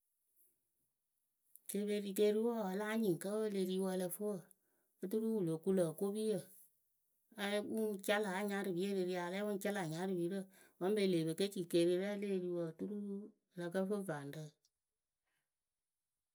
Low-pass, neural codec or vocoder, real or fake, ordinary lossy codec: none; none; real; none